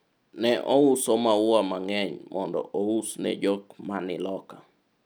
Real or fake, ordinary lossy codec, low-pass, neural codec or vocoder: real; none; none; none